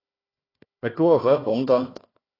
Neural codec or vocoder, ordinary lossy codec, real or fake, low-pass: codec, 16 kHz, 1 kbps, FunCodec, trained on Chinese and English, 50 frames a second; AAC, 24 kbps; fake; 5.4 kHz